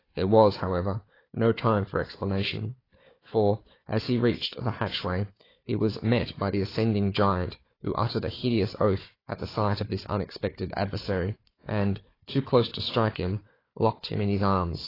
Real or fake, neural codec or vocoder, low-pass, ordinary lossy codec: fake; codec, 16 kHz, 4 kbps, FunCodec, trained on Chinese and English, 50 frames a second; 5.4 kHz; AAC, 24 kbps